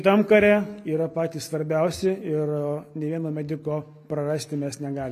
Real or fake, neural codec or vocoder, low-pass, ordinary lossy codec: real; none; 14.4 kHz; AAC, 96 kbps